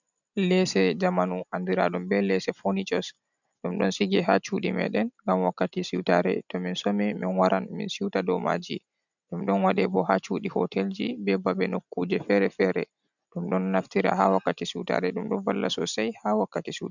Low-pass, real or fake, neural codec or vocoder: 7.2 kHz; real; none